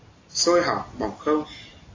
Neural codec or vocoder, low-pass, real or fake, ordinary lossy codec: none; 7.2 kHz; real; AAC, 32 kbps